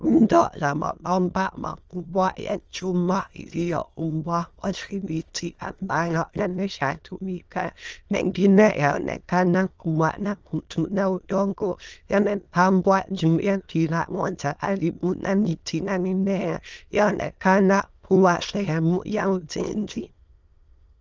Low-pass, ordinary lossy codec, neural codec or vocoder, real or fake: 7.2 kHz; Opus, 24 kbps; autoencoder, 22.05 kHz, a latent of 192 numbers a frame, VITS, trained on many speakers; fake